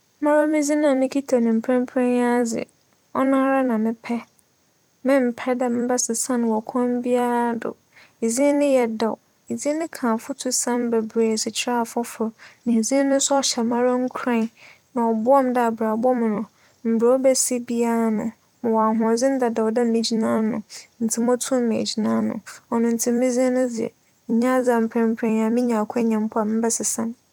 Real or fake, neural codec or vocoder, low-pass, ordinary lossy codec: fake; vocoder, 44.1 kHz, 128 mel bands every 256 samples, BigVGAN v2; 19.8 kHz; none